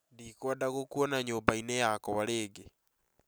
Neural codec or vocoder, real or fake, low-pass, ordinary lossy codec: none; real; none; none